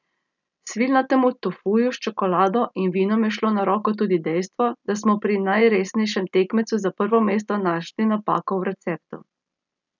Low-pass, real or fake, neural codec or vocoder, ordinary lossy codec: 7.2 kHz; real; none; none